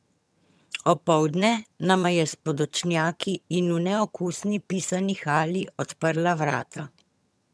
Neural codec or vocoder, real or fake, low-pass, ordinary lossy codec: vocoder, 22.05 kHz, 80 mel bands, HiFi-GAN; fake; none; none